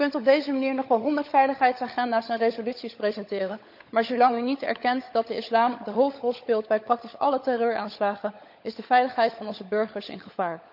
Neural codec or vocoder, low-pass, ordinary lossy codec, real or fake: codec, 16 kHz, 16 kbps, FunCodec, trained on LibriTTS, 50 frames a second; 5.4 kHz; none; fake